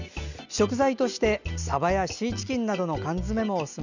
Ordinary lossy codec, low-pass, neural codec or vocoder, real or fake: none; 7.2 kHz; none; real